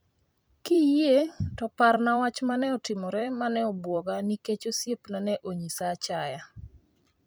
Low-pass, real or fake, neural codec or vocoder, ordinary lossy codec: none; fake; vocoder, 44.1 kHz, 128 mel bands every 256 samples, BigVGAN v2; none